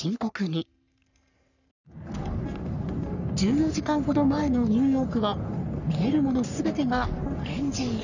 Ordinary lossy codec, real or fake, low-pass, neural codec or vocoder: none; fake; 7.2 kHz; codec, 44.1 kHz, 3.4 kbps, Pupu-Codec